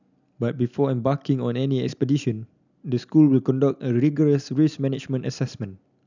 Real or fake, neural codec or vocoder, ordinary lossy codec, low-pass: real; none; none; 7.2 kHz